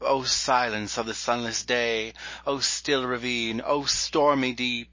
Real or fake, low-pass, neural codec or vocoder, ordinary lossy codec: real; 7.2 kHz; none; MP3, 32 kbps